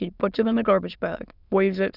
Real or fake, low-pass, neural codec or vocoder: fake; 5.4 kHz; autoencoder, 22.05 kHz, a latent of 192 numbers a frame, VITS, trained on many speakers